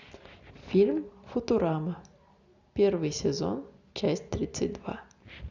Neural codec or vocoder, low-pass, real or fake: none; 7.2 kHz; real